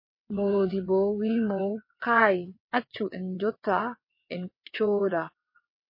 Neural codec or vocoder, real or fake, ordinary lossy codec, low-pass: vocoder, 22.05 kHz, 80 mel bands, WaveNeXt; fake; MP3, 24 kbps; 5.4 kHz